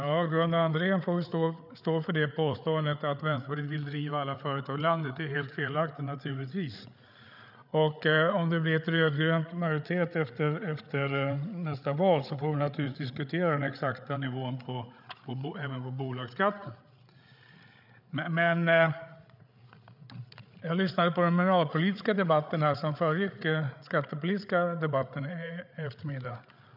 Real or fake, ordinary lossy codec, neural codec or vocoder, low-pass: fake; none; codec, 16 kHz, 8 kbps, FreqCodec, larger model; 5.4 kHz